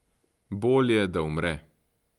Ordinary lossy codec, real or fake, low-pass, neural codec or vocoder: Opus, 32 kbps; real; 19.8 kHz; none